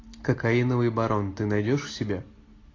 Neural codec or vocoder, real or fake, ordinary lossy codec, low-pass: none; real; AAC, 32 kbps; 7.2 kHz